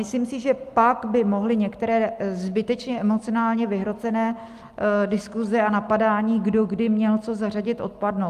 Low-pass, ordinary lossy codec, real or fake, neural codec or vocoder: 14.4 kHz; Opus, 32 kbps; real; none